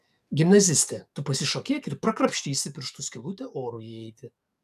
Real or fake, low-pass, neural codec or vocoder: fake; 14.4 kHz; codec, 44.1 kHz, 7.8 kbps, DAC